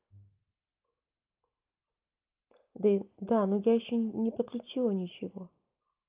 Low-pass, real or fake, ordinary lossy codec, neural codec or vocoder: 3.6 kHz; real; Opus, 32 kbps; none